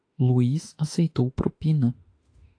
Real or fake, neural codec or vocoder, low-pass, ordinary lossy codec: fake; autoencoder, 48 kHz, 32 numbers a frame, DAC-VAE, trained on Japanese speech; 9.9 kHz; AAC, 48 kbps